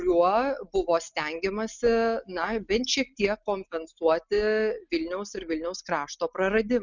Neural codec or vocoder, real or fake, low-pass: none; real; 7.2 kHz